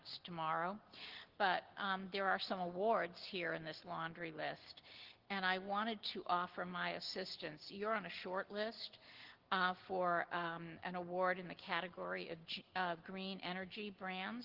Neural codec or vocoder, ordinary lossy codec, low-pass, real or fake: none; Opus, 16 kbps; 5.4 kHz; real